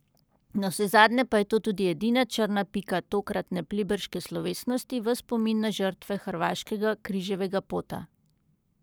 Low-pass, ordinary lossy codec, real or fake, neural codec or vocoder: none; none; fake; codec, 44.1 kHz, 7.8 kbps, Pupu-Codec